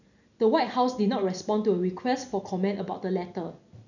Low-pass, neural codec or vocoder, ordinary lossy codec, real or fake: 7.2 kHz; none; none; real